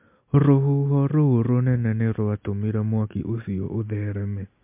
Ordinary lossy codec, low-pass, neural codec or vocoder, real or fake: MP3, 32 kbps; 3.6 kHz; none; real